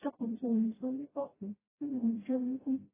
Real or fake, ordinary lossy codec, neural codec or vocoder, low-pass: fake; AAC, 16 kbps; codec, 16 kHz, 0.5 kbps, FreqCodec, smaller model; 3.6 kHz